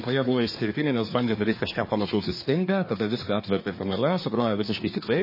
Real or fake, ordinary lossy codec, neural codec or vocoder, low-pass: fake; MP3, 24 kbps; codec, 24 kHz, 1 kbps, SNAC; 5.4 kHz